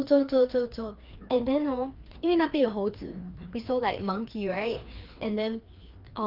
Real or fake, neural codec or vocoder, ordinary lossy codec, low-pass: fake; codec, 16 kHz, 2 kbps, FreqCodec, larger model; Opus, 24 kbps; 5.4 kHz